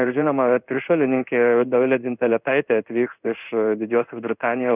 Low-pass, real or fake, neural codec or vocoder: 3.6 kHz; fake; codec, 16 kHz in and 24 kHz out, 1 kbps, XY-Tokenizer